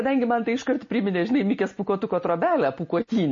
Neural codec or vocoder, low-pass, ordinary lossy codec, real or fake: none; 7.2 kHz; MP3, 32 kbps; real